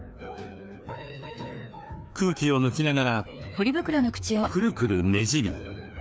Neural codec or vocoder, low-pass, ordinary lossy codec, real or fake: codec, 16 kHz, 2 kbps, FreqCodec, larger model; none; none; fake